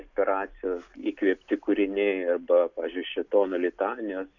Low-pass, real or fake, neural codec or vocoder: 7.2 kHz; real; none